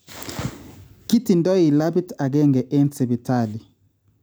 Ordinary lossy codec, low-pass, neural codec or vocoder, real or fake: none; none; none; real